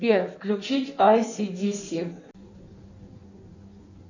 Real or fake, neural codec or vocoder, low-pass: fake; codec, 16 kHz in and 24 kHz out, 1.1 kbps, FireRedTTS-2 codec; 7.2 kHz